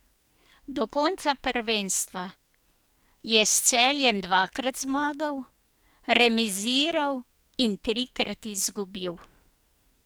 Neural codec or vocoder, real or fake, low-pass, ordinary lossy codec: codec, 44.1 kHz, 2.6 kbps, SNAC; fake; none; none